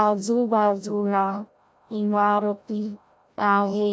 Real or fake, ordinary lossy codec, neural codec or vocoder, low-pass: fake; none; codec, 16 kHz, 0.5 kbps, FreqCodec, larger model; none